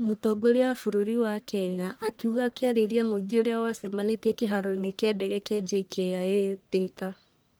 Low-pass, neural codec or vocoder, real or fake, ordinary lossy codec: none; codec, 44.1 kHz, 1.7 kbps, Pupu-Codec; fake; none